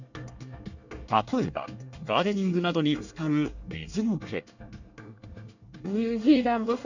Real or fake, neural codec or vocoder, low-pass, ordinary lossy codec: fake; codec, 24 kHz, 1 kbps, SNAC; 7.2 kHz; none